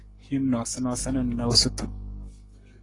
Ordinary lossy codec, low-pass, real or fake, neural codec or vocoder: AAC, 32 kbps; 10.8 kHz; fake; codec, 44.1 kHz, 7.8 kbps, Pupu-Codec